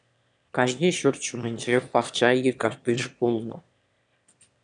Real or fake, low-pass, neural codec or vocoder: fake; 9.9 kHz; autoencoder, 22.05 kHz, a latent of 192 numbers a frame, VITS, trained on one speaker